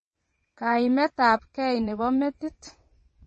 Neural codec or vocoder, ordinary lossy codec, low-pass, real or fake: vocoder, 22.05 kHz, 80 mel bands, WaveNeXt; MP3, 32 kbps; 9.9 kHz; fake